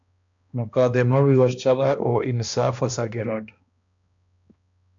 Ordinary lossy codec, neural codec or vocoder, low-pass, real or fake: AAC, 64 kbps; codec, 16 kHz, 1 kbps, X-Codec, HuBERT features, trained on balanced general audio; 7.2 kHz; fake